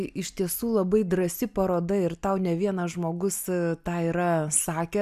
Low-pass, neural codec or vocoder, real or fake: 14.4 kHz; none; real